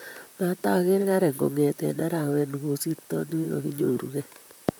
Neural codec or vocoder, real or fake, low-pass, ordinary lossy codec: vocoder, 44.1 kHz, 128 mel bands, Pupu-Vocoder; fake; none; none